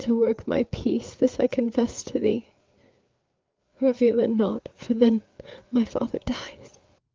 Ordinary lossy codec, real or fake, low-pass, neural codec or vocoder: Opus, 24 kbps; fake; 7.2 kHz; codec, 16 kHz, 4 kbps, FreqCodec, larger model